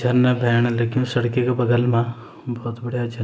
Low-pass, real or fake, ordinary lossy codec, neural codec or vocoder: none; real; none; none